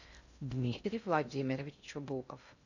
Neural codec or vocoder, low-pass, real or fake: codec, 16 kHz in and 24 kHz out, 0.6 kbps, FocalCodec, streaming, 4096 codes; 7.2 kHz; fake